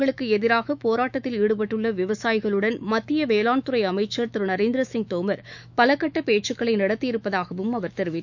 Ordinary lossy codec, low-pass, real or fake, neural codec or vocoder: none; 7.2 kHz; fake; autoencoder, 48 kHz, 128 numbers a frame, DAC-VAE, trained on Japanese speech